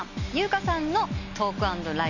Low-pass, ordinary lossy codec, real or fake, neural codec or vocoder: 7.2 kHz; none; real; none